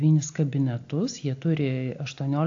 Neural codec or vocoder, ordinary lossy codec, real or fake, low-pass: none; AAC, 48 kbps; real; 7.2 kHz